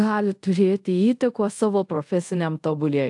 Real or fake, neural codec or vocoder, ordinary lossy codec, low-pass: fake; codec, 24 kHz, 0.5 kbps, DualCodec; AAC, 64 kbps; 10.8 kHz